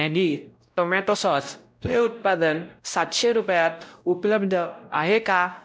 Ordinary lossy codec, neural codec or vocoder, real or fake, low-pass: none; codec, 16 kHz, 0.5 kbps, X-Codec, WavLM features, trained on Multilingual LibriSpeech; fake; none